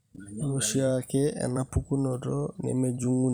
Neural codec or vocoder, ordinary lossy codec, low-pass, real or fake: vocoder, 44.1 kHz, 128 mel bands every 256 samples, BigVGAN v2; none; none; fake